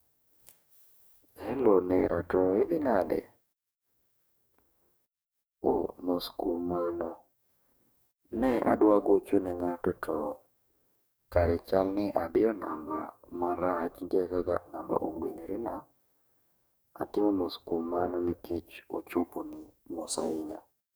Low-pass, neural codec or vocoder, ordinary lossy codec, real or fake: none; codec, 44.1 kHz, 2.6 kbps, DAC; none; fake